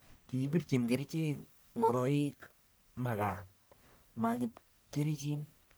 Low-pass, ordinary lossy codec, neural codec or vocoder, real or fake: none; none; codec, 44.1 kHz, 1.7 kbps, Pupu-Codec; fake